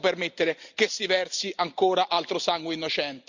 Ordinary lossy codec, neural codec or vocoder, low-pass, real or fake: Opus, 64 kbps; none; 7.2 kHz; real